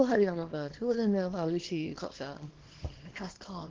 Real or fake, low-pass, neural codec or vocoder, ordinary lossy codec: fake; 7.2 kHz; codec, 24 kHz, 0.9 kbps, WavTokenizer, small release; Opus, 16 kbps